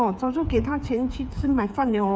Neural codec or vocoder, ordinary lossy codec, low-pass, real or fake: codec, 16 kHz, 8 kbps, FreqCodec, smaller model; none; none; fake